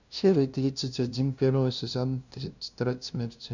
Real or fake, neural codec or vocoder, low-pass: fake; codec, 16 kHz, 0.5 kbps, FunCodec, trained on LibriTTS, 25 frames a second; 7.2 kHz